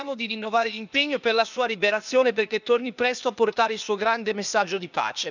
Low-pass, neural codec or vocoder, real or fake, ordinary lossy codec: 7.2 kHz; codec, 16 kHz, 0.8 kbps, ZipCodec; fake; none